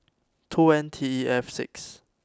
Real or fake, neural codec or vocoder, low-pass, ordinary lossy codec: real; none; none; none